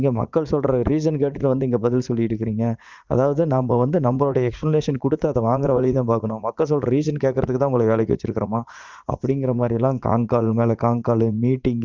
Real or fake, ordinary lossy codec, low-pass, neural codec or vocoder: fake; Opus, 32 kbps; 7.2 kHz; vocoder, 44.1 kHz, 80 mel bands, Vocos